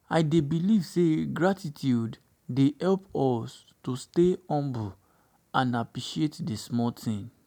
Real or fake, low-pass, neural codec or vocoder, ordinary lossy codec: real; none; none; none